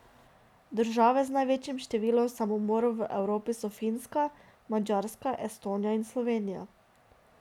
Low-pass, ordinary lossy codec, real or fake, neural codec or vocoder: 19.8 kHz; none; real; none